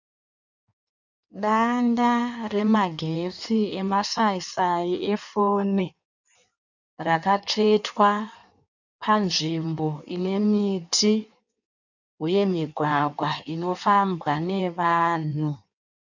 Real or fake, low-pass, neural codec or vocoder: fake; 7.2 kHz; codec, 16 kHz in and 24 kHz out, 1.1 kbps, FireRedTTS-2 codec